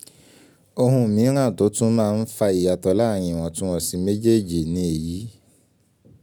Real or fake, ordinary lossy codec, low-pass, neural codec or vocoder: real; none; none; none